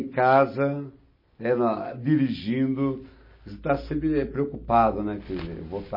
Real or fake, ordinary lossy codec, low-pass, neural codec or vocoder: real; MP3, 24 kbps; 5.4 kHz; none